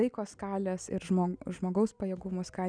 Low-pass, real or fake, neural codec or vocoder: 9.9 kHz; real; none